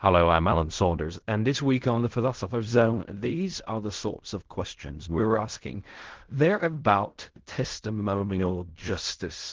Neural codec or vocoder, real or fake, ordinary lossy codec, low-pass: codec, 16 kHz in and 24 kHz out, 0.4 kbps, LongCat-Audio-Codec, fine tuned four codebook decoder; fake; Opus, 16 kbps; 7.2 kHz